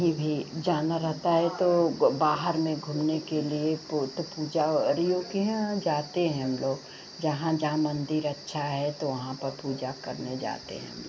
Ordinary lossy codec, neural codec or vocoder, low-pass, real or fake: none; none; none; real